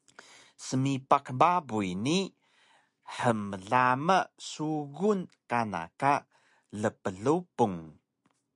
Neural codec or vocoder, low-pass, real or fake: none; 10.8 kHz; real